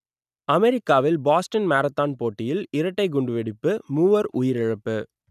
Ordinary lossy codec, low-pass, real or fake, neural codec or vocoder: none; 14.4 kHz; real; none